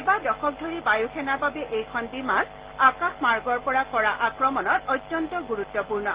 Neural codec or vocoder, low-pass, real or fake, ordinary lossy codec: none; 3.6 kHz; real; Opus, 16 kbps